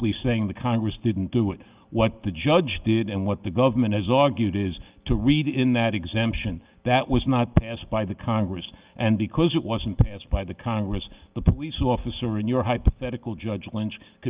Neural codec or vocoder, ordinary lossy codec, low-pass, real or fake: none; Opus, 32 kbps; 3.6 kHz; real